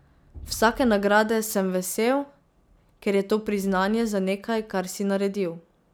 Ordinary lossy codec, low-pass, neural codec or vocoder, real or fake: none; none; none; real